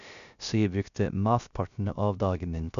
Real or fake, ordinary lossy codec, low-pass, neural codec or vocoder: fake; none; 7.2 kHz; codec, 16 kHz, 0.3 kbps, FocalCodec